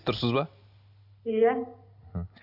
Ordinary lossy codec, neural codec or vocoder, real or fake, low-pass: none; none; real; 5.4 kHz